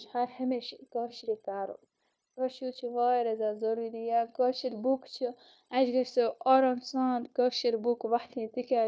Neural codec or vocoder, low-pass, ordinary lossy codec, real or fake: codec, 16 kHz, 0.9 kbps, LongCat-Audio-Codec; none; none; fake